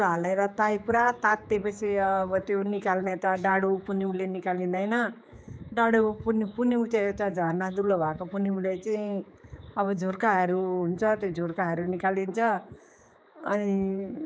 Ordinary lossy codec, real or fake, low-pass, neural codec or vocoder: none; fake; none; codec, 16 kHz, 4 kbps, X-Codec, HuBERT features, trained on general audio